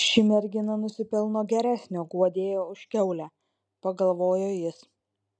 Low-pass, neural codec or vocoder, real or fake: 9.9 kHz; none; real